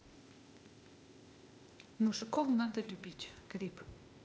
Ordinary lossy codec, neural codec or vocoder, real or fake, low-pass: none; codec, 16 kHz, 0.8 kbps, ZipCodec; fake; none